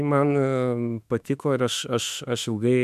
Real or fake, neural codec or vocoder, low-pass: fake; autoencoder, 48 kHz, 32 numbers a frame, DAC-VAE, trained on Japanese speech; 14.4 kHz